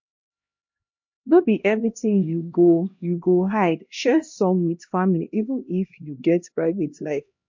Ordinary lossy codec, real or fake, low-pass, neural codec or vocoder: MP3, 48 kbps; fake; 7.2 kHz; codec, 16 kHz, 1 kbps, X-Codec, HuBERT features, trained on LibriSpeech